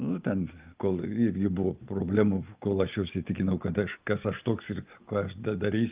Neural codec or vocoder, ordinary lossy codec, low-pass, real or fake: none; Opus, 24 kbps; 3.6 kHz; real